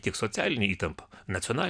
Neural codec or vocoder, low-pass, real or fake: none; 9.9 kHz; real